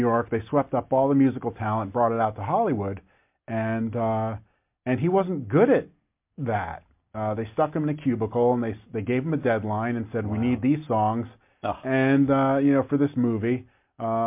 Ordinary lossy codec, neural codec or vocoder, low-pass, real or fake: MP3, 32 kbps; none; 3.6 kHz; real